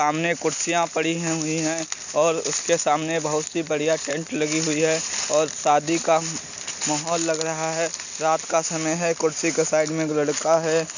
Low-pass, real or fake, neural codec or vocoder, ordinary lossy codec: 7.2 kHz; real; none; none